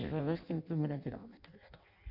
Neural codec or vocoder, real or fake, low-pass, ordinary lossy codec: codec, 16 kHz in and 24 kHz out, 0.6 kbps, FireRedTTS-2 codec; fake; 5.4 kHz; none